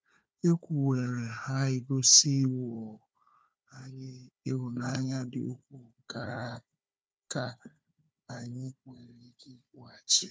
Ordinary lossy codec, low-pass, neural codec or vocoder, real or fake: none; none; codec, 16 kHz, 4 kbps, FunCodec, trained on Chinese and English, 50 frames a second; fake